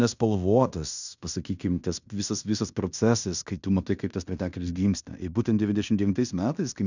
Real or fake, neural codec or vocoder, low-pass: fake; codec, 16 kHz in and 24 kHz out, 0.9 kbps, LongCat-Audio-Codec, fine tuned four codebook decoder; 7.2 kHz